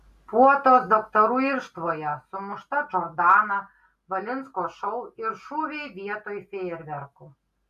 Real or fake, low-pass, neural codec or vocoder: real; 14.4 kHz; none